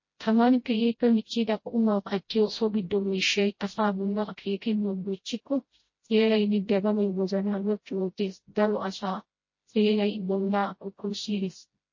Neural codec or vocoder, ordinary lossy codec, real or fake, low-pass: codec, 16 kHz, 0.5 kbps, FreqCodec, smaller model; MP3, 32 kbps; fake; 7.2 kHz